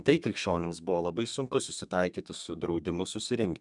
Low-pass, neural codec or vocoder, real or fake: 10.8 kHz; codec, 32 kHz, 1.9 kbps, SNAC; fake